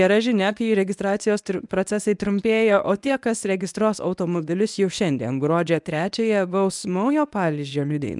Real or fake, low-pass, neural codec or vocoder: fake; 10.8 kHz; codec, 24 kHz, 0.9 kbps, WavTokenizer, medium speech release version 1